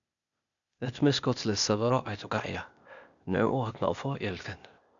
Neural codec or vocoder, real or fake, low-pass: codec, 16 kHz, 0.8 kbps, ZipCodec; fake; 7.2 kHz